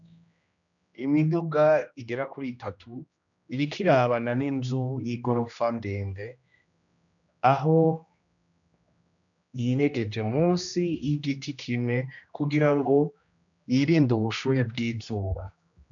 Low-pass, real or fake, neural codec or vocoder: 7.2 kHz; fake; codec, 16 kHz, 1 kbps, X-Codec, HuBERT features, trained on general audio